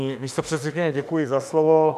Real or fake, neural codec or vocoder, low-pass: fake; autoencoder, 48 kHz, 32 numbers a frame, DAC-VAE, trained on Japanese speech; 14.4 kHz